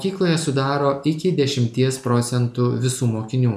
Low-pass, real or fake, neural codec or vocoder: 14.4 kHz; real; none